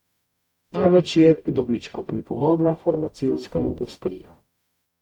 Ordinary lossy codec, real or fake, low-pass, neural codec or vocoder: none; fake; 19.8 kHz; codec, 44.1 kHz, 0.9 kbps, DAC